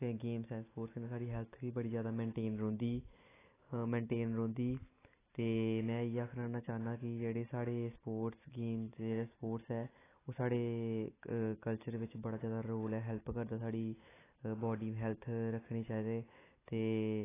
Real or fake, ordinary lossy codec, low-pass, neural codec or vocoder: real; AAC, 16 kbps; 3.6 kHz; none